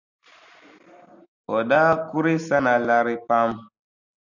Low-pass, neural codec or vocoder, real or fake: 7.2 kHz; none; real